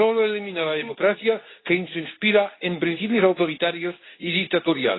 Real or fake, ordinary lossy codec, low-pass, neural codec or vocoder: fake; AAC, 16 kbps; 7.2 kHz; codec, 24 kHz, 0.9 kbps, WavTokenizer, medium speech release version 2